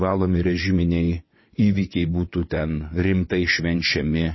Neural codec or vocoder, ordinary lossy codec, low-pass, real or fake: vocoder, 22.05 kHz, 80 mel bands, WaveNeXt; MP3, 24 kbps; 7.2 kHz; fake